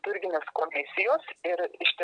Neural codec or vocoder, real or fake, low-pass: none; real; 9.9 kHz